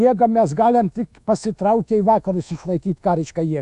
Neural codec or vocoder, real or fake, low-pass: codec, 24 kHz, 1.2 kbps, DualCodec; fake; 10.8 kHz